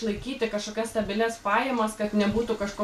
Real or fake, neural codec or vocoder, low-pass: real; none; 14.4 kHz